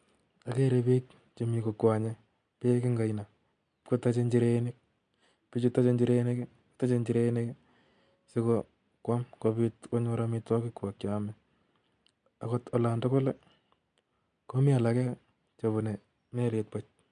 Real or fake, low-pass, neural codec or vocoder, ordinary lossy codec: real; 9.9 kHz; none; MP3, 64 kbps